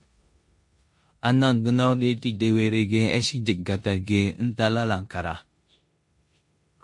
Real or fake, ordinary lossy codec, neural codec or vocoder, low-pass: fake; MP3, 48 kbps; codec, 16 kHz in and 24 kHz out, 0.9 kbps, LongCat-Audio-Codec, four codebook decoder; 10.8 kHz